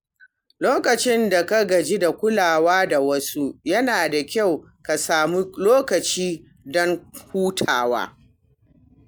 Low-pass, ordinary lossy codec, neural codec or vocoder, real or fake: none; none; none; real